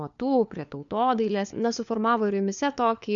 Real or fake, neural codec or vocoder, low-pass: fake; codec, 16 kHz, 4 kbps, FunCodec, trained on LibriTTS, 50 frames a second; 7.2 kHz